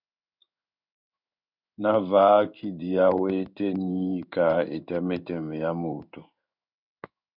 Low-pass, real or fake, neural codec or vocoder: 5.4 kHz; fake; codec, 16 kHz in and 24 kHz out, 1 kbps, XY-Tokenizer